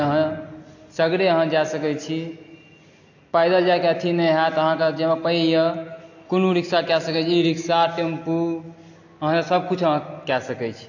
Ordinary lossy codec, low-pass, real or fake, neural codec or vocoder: AAC, 48 kbps; 7.2 kHz; real; none